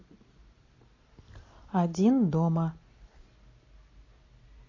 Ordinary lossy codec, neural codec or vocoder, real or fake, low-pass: AAC, 32 kbps; none; real; 7.2 kHz